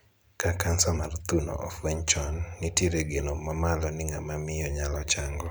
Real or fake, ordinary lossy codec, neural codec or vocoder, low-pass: real; none; none; none